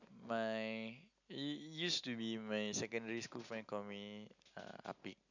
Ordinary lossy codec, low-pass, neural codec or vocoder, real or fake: none; 7.2 kHz; none; real